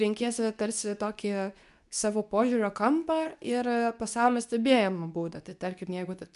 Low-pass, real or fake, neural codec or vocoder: 10.8 kHz; fake; codec, 24 kHz, 0.9 kbps, WavTokenizer, medium speech release version 2